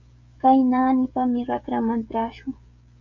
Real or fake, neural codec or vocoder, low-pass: fake; codec, 16 kHz, 16 kbps, FreqCodec, smaller model; 7.2 kHz